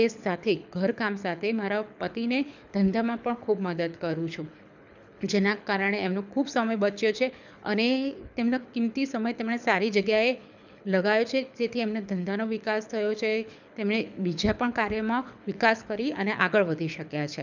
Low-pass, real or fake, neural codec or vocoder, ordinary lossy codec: 7.2 kHz; fake; codec, 24 kHz, 6 kbps, HILCodec; none